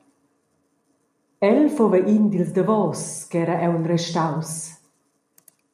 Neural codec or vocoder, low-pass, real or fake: none; 14.4 kHz; real